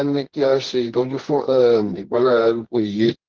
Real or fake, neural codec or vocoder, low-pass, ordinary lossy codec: fake; codec, 24 kHz, 0.9 kbps, WavTokenizer, medium music audio release; 7.2 kHz; Opus, 16 kbps